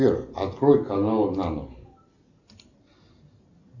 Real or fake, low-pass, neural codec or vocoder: real; 7.2 kHz; none